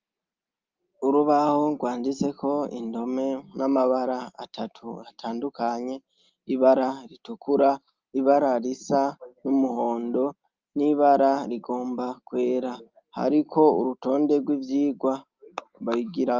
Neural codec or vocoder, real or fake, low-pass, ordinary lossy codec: none; real; 7.2 kHz; Opus, 32 kbps